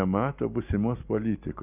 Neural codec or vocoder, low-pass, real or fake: none; 3.6 kHz; real